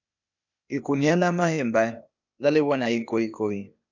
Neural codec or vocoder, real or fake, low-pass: codec, 16 kHz, 0.8 kbps, ZipCodec; fake; 7.2 kHz